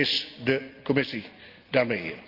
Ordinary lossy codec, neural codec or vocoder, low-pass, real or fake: Opus, 32 kbps; none; 5.4 kHz; real